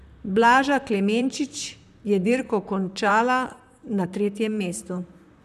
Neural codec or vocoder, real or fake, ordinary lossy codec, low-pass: vocoder, 44.1 kHz, 128 mel bands, Pupu-Vocoder; fake; none; 14.4 kHz